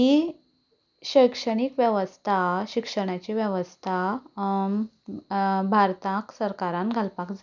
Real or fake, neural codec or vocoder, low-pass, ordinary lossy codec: real; none; 7.2 kHz; none